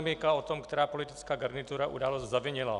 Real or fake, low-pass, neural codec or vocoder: real; 10.8 kHz; none